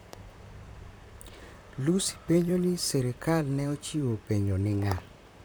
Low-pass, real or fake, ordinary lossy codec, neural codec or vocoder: none; fake; none; vocoder, 44.1 kHz, 128 mel bands, Pupu-Vocoder